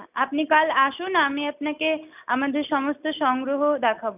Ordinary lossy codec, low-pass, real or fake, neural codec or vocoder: none; 3.6 kHz; real; none